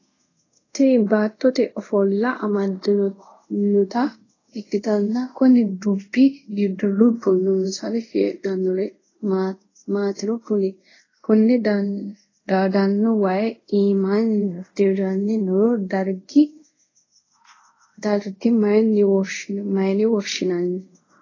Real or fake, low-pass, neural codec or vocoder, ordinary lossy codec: fake; 7.2 kHz; codec, 24 kHz, 0.5 kbps, DualCodec; AAC, 32 kbps